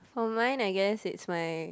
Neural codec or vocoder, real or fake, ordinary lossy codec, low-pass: none; real; none; none